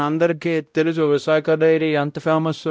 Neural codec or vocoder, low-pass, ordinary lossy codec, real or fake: codec, 16 kHz, 0.5 kbps, X-Codec, WavLM features, trained on Multilingual LibriSpeech; none; none; fake